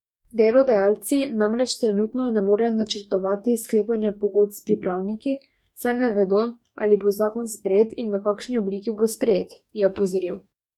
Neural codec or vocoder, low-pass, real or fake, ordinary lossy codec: codec, 44.1 kHz, 2.6 kbps, DAC; 19.8 kHz; fake; none